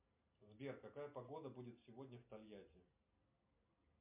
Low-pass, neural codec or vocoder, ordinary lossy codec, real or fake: 3.6 kHz; none; MP3, 32 kbps; real